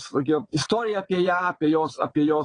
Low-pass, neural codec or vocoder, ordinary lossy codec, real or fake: 9.9 kHz; vocoder, 22.05 kHz, 80 mel bands, WaveNeXt; AAC, 32 kbps; fake